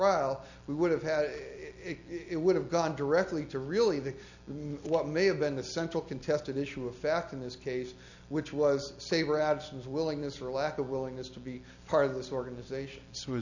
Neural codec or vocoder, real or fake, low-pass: none; real; 7.2 kHz